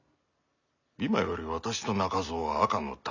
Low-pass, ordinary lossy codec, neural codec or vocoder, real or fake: 7.2 kHz; none; none; real